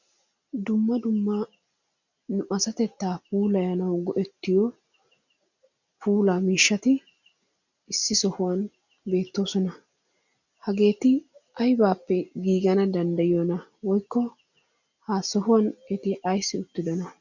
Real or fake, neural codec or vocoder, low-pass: real; none; 7.2 kHz